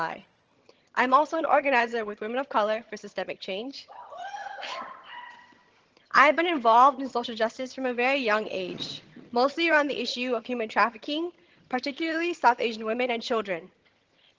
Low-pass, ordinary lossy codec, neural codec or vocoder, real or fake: 7.2 kHz; Opus, 16 kbps; vocoder, 22.05 kHz, 80 mel bands, HiFi-GAN; fake